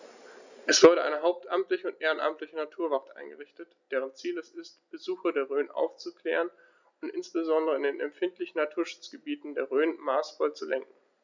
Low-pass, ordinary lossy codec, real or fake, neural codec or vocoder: 7.2 kHz; none; real; none